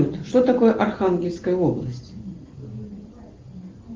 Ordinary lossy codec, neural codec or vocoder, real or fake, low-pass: Opus, 16 kbps; none; real; 7.2 kHz